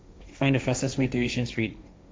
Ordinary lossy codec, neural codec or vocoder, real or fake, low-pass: none; codec, 16 kHz, 1.1 kbps, Voila-Tokenizer; fake; none